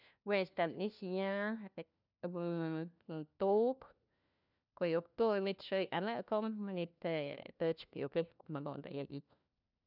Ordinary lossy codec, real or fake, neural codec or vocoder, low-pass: none; fake; codec, 16 kHz, 1 kbps, FunCodec, trained on LibriTTS, 50 frames a second; 5.4 kHz